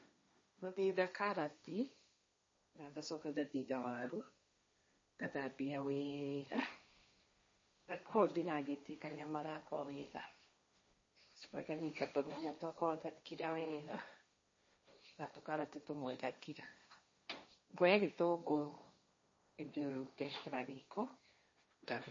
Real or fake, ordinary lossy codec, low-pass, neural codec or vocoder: fake; MP3, 32 kbps; 7.2 kHz; codec, 16 kHz, 1.1 kbps, Voila-Tokenizer